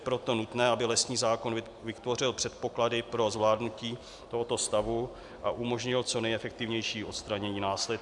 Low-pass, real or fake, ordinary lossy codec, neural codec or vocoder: 10.8 kHz; real; MP3, 96 kbps; none